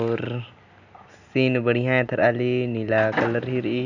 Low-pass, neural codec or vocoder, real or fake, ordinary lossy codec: 7.2 kHz; none; real; none